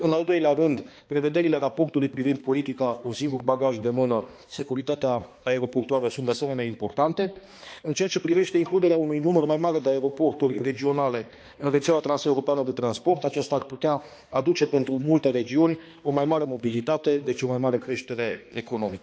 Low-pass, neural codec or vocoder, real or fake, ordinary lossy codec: none; codec, 16 kHz, 2 kbps, X-Codec, HuBERT features, trained on balanced general audio; fake; none